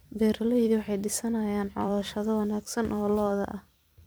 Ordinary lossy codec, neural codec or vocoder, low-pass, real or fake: none; vocoder, 44.1 kHz, 128 mel bands every 256 samples, BigVGAN v2; none; fake